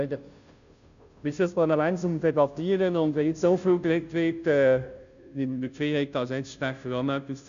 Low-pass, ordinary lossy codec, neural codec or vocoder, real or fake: 7.2 kHz; none; codec, 16 kHz, 0.5 kbps, FunCodec, trained on Chinese and English, 25 frames a second; fake